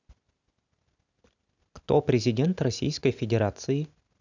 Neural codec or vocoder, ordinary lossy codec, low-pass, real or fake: none; none; 7.2 kHz; real